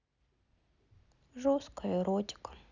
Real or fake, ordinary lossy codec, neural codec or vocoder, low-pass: real; none; none; 7.2 kHz